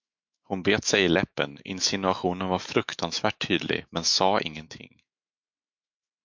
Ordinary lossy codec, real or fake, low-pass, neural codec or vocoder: MP3, 64 kbps; fake; 7.2 kHz; autoencoder, 48 kHz, 128 numbers a frame, DAC-VAE, trained on Japanese speech